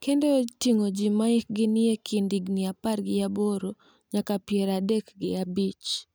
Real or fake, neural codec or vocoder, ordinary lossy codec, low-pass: real; none; none; none